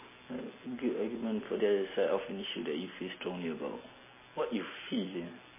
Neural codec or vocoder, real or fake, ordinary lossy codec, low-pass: none; real; MP3, 16 kbps; 3.6 kHz